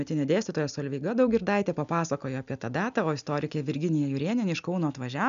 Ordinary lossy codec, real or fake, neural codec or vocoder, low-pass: MP3, 96 kbps; real; none; 7.2 kHz